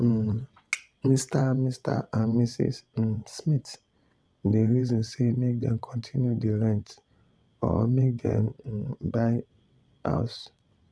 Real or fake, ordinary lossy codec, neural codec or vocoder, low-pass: fake; none; vocoder, 22.05 kHz, 80 mel bands, WaveNeXt; none